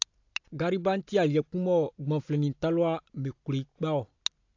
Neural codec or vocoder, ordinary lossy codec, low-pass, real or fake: none; AAC, 48 kbps; 7.2 kHz; real